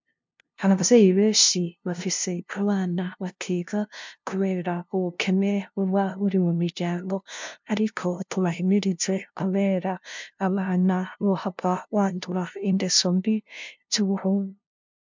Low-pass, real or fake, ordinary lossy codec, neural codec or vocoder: 7.2 kHz; fake; MP3, 64 kbps; codec, 16 kHz, 0.5 kbps, FunCodec, trained on LibriTTS, 25 frames a second